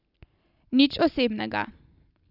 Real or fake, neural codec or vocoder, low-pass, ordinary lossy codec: real; none; 5.4 kHz; none